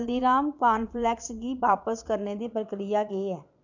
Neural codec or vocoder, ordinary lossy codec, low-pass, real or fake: vocoder, 22.05 kHz, 80 mel bands, Vocos; none; 7.2 kHz; fake